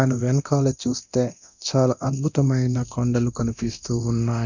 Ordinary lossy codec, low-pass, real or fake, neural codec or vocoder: none; 7.2 kHz; fake; codec, 24 kHz, 0.9 kbps, DualCodec